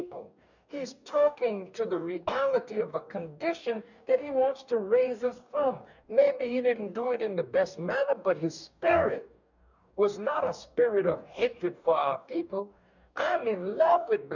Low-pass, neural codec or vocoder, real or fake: 7.2 kHz; codec, 44.1 kHz, 2.6 kbps, DAC; fake